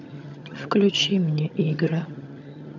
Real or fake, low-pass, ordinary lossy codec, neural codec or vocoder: fake; 7.2 kHz; none; vocoder, 22.05 kHz, 80 mel bands, HiFi-GAN